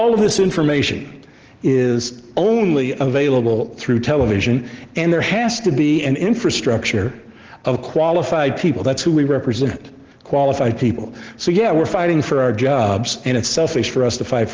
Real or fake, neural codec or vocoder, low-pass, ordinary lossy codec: real; none; 7.2 kHz; Opus, 16 kbps